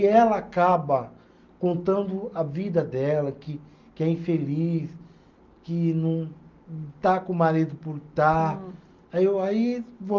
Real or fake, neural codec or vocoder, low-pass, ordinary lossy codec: real; none; 7.2 kHz; Opus, 32 kbps